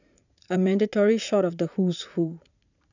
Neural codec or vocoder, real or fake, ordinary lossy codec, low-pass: vocoder, 44.1 kHz, 80 mel bands, Vocos; fake; none; 7.2 kHz